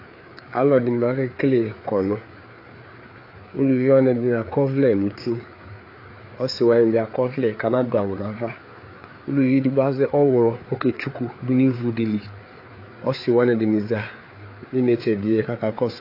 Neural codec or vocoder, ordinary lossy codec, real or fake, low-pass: codec, 16 kHz, 4 kbps, FreqCodec, larger model; AAC, 32 kbps; fake; 5.4 kHz